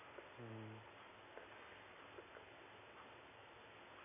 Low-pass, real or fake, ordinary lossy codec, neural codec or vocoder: 3.6 kHz; real; MP3, 16 kbps; none